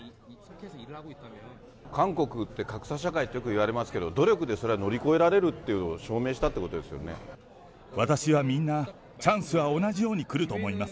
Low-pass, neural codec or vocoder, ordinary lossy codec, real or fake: none; none; none; real